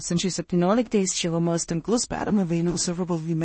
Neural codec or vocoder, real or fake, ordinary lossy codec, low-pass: codec, 16 kHz in and 24 kHz out, 0.4 kbps, LongCat-Audio-Codec, two codebook decoder; fake; MP3, 32 kbps; 9.9 kHz